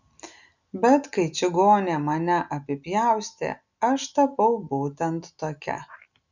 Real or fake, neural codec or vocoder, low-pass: real; none; 7.2 kHz